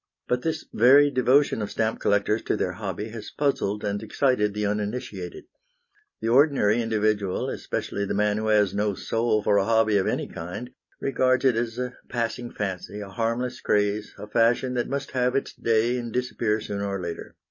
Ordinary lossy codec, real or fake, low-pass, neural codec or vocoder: MP3, 32 kbps; real; 7.2 kHz; none